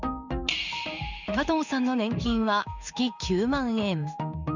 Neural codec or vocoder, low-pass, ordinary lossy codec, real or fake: codec, 16 kHz in and 24 kHz out, 1 kbps, XY-Tokenizer; 7.2 kHz; none; fake